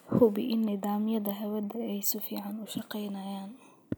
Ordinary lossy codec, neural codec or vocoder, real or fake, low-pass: none; none; real; none